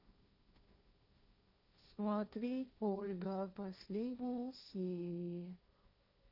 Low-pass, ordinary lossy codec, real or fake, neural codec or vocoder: 5.4 kHz; none; fake; codec, 16 kHz, 1.1 kbps, Voila-Tokenizer